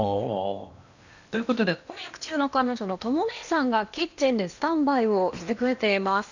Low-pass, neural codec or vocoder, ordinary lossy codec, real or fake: 7.2 kHz; codec, 16 kHz in and 24 kHz out, 0.8 kbps, FocalCodec, streaming, 65536 codes; none; fake